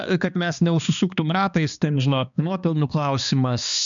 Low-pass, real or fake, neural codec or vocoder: 7.2 kHz; fake; codec, 16 kHz, 2 kbps, X-Codec, HuBERT features, trained on balanced general audio